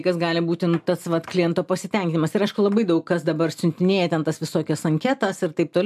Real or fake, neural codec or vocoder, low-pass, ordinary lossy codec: real; none; 14.4 kHz; MP3, 96 kbps